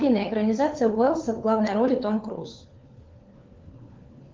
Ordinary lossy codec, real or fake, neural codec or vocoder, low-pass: Opus, 32 kbps; fake; codec, 16 kHz, 4 kbps, FunCodec, trained on LibriTTS, 50 frames a second; 7.2 kHz